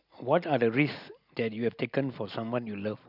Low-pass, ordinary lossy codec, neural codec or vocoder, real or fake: 5.4 kHz; none; none; real